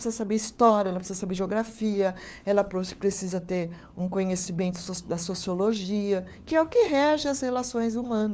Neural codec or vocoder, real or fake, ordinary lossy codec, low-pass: codec, 16 kHz, 4 kbps, FunCodec, trained on LibriTTS, 50 frames a second; fake; none; none